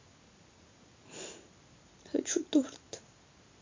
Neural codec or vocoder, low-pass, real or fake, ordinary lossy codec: none; 7.2 kHz; real; MP3, 48 kbps